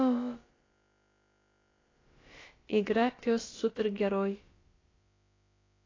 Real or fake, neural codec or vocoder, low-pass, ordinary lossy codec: fake; codec, 16 kHz, about 1 kbps, DyCAST, with the encoder's durations; 7.2 kHz; AAC, 32 kbps